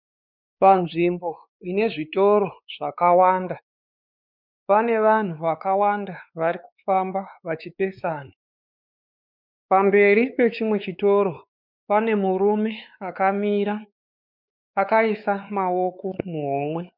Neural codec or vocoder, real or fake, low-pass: codec, 16 kHz, 4 kbps, X-Codec, WavLM features, trained on Multilingual LibriSpeech; fake; 5.4 kHz